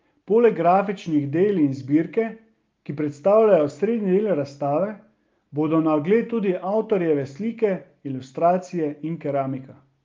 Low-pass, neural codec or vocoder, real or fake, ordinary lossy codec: 7.2 kHz; none; real; Opus, 32 kbps